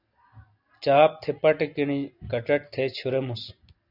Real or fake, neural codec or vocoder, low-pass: real; none; 5.4 kHz